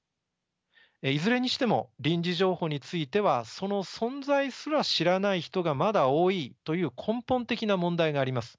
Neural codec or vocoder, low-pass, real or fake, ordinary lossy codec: none; none; real; none